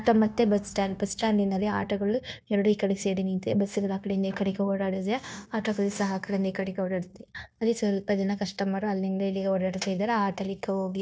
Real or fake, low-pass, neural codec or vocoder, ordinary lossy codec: fake; none; codec, 16 kHz, 0.9 kbps, LongCat-Audio-Codec; none